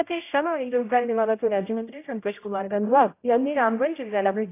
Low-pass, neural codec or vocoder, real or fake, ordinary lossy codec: 3.6 kHz; codec, 16 kHz, 0.5 kbps, X-Codec, HuBERT features, trained on general audio; fake; AAC, 24 kbps